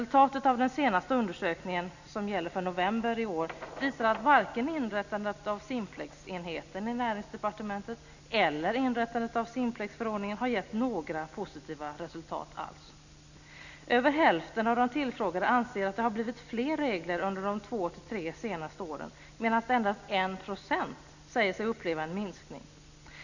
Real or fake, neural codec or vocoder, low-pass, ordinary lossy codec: real; none; 7.2 kHz; none